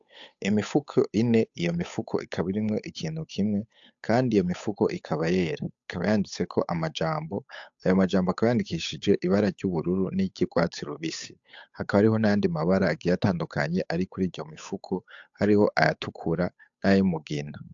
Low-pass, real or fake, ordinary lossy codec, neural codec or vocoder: 7.2 kHz; fake; AAC, 64 kbps; codec, 16 kHz, 8 kbps, FunCodec, trained on Chinese and English, 25 frames a second